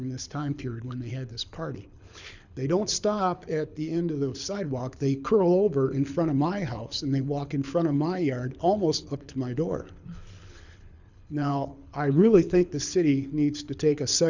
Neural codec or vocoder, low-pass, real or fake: codec, 24 kHz, 6 kbps, HILCodec; 7.2 kHz; fake